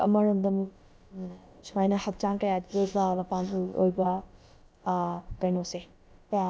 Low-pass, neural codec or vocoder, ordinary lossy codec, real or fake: none; codec, 16 kHz, about 1 kbps, DyCAST, with the encoder's durations; none; fake